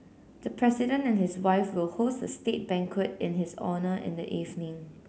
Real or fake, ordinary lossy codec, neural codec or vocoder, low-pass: real; none; none; none